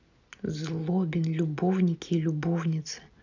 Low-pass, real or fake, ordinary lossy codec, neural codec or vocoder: 7.2 kHz; real; none; none